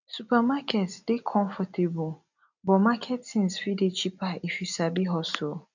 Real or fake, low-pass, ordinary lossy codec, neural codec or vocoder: real; 7.2 kHz; none; none